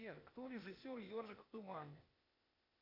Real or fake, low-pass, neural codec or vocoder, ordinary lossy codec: fake; 5.4 kHz; codec, 16 kHz, 0.8 kbps, ZipCodec; AAC, 48 kbps